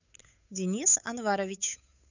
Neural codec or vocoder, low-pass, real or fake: codec, 16 kHz, 8 kbps, FunCodec, trained on Chinese and English, 25 frames a second; 7.2 kHz; fake